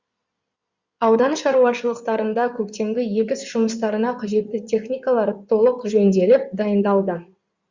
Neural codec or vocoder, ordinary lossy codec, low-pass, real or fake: codec, 16 kHz in and 24 kHz out, 2.2 kbps, FireRedTTS-2 codec; Opus, 64 kbps; 7.2 kHz; fake